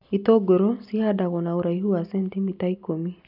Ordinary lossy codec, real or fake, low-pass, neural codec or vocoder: none; real; 5.4 kHz; none